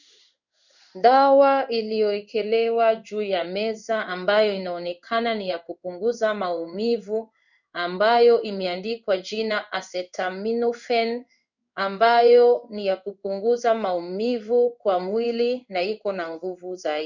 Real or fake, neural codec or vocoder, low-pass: fake; codec, 16 kHz in and 24 kHz out, 1 kbps, XY-Tokenizer; 7.2 kHz